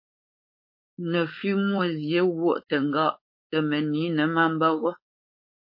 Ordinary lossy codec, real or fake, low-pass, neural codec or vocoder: MP3, 32 kbps; fake; 5.4 kHz; codec, 16 kHz, 4.8 kbps, FACodec